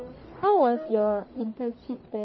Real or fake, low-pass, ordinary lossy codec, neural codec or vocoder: fake; 7.2 kHz; MP3, 24 kbps; codec, 44.1 kHz, 1.7 kbps, Pupu-Codec